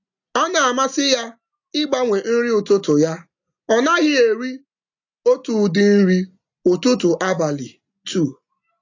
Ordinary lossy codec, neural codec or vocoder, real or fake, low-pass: AAC, 48 kbps; none; real; 7.2 kHz